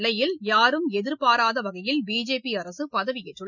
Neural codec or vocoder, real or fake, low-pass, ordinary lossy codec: none; real; 7.2 kHz; none